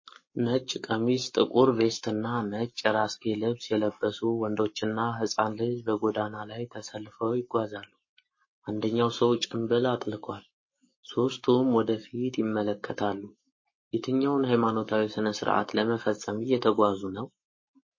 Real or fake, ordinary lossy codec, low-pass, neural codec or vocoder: fake; MP3, 32 kbps; 7.2 kHz; codec, 44.1 kHz, 7.8 kbps, Pupu-Codec